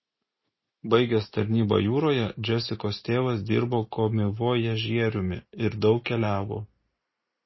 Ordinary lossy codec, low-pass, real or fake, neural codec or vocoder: MP3, 24 kbps; 7.2 kHz; real; none